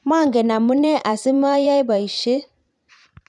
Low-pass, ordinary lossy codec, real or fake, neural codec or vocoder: 10.8 kHz; none; fake; vocoder, 44.1 kHz, 128 mel bands every 512 samples, BigVGAN v2